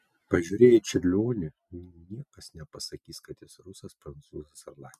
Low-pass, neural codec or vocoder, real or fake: 14.4 kHz; none; real